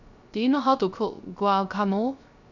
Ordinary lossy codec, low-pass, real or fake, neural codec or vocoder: none; 7.2 kHz; fake; codec, 16 kHz, 0.3 kbps, FocalCodec